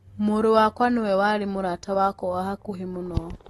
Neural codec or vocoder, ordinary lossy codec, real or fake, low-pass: none; AAC, 32 kbps; real; 19.8 kHz